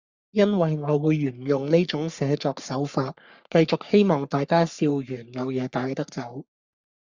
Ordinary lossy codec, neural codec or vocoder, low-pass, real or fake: Opus, 64 kbps; codec, 44.1 kHz, 3.4 kbps, Pupu-Codec; 7.2 kHz; fake